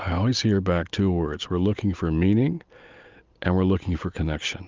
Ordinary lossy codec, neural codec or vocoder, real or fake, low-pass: Opus, 24 kbps; none; real; 7.2 kHz